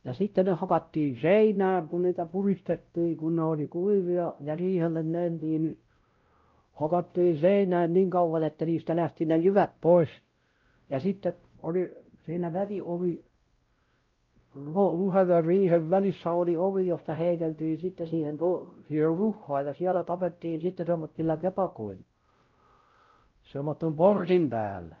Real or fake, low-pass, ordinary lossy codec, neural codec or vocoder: fake; 7.2 kHz; Opus, 24 kbps; codec, 16 kHz, 0.5 kbps, X-Codec, WavLM features, trained on Multilingual LibriSpeech